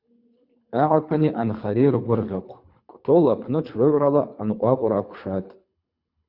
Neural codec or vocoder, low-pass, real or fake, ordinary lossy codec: codec, 24 kHz, 3 kbps, HILCodec; 5.4 kHz; fake; Opus, 64 kbps